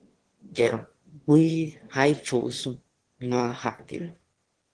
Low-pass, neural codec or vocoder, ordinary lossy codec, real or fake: 9.9 kHz; autoencoder, 22.05 kHz, a latent of 192 numbers a frame, VITS, trained on one speaker; Opus, 16 kbps; fake